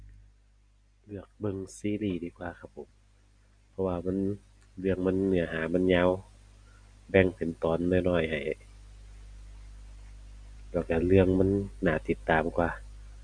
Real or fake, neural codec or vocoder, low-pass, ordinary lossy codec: real; none; 9.9 kHz; none